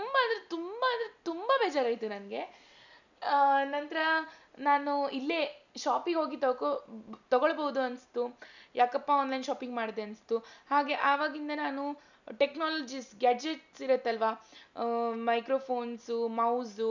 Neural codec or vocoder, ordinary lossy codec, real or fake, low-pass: none; none; real; 7.2 kHz